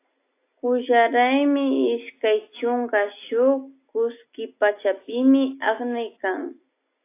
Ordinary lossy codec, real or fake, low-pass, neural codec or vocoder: AAC, 24 kbps; real; 3.6 kHz; none